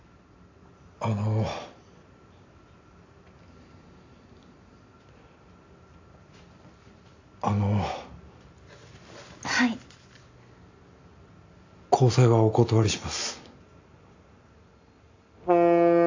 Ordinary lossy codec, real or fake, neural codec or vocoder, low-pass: AAC, 48 kbps; real; none; 7.2 kHz